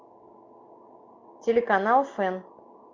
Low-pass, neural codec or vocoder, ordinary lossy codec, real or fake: 7.2 kHz; none; MP3, 48 kbps; real